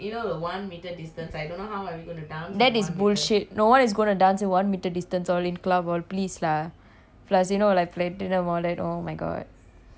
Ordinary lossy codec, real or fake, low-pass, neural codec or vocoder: none; real; none; none